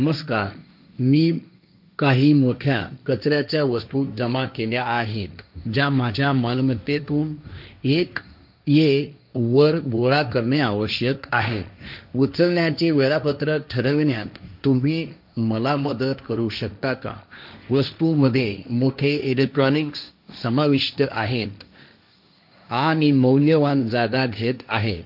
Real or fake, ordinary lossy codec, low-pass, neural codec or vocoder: fake; none; 5.4 kHz; codec, 16 kHz, 1.1 kbps, Voila-Tokenizer